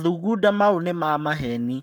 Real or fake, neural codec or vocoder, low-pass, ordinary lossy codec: fake; codec, 44.1 kHz, 7.8 kbps, Pupu-Codec; none; none